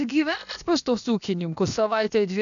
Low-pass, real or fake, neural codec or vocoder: 7.2 kHz; fake; codec, 16 kHz, 0.7 kbps, FocalCodec